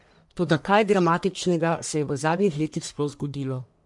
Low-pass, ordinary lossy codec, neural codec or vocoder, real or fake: 10.8 kHz; MP3, 64 kbps; codec, 44.1 kHz, 1.7 kbps, Pupu-Codec; fake